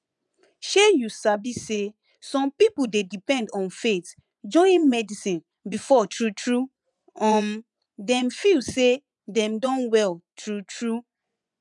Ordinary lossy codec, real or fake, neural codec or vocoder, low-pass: none; fake; vocoder, 24 kHz, 100 mel bands, Vocos; 10.8 kHz